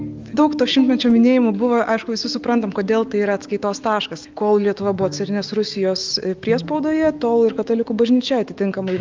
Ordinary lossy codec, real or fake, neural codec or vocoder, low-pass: Opus, 32 kbps; fake; autoencoder, 48 kHz, 128 numbers a frame, DAC-VAE, trained on Japanese speech; 7.2 kHz